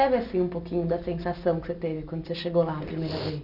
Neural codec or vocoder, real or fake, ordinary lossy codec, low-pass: none; real; none; 5.4 kHz